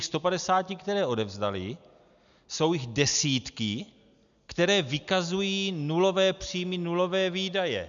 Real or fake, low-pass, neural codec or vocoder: real; 7.2 kHz; none